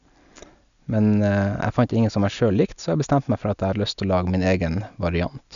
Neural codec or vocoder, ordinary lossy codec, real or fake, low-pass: none; none; real; 7.2 kHz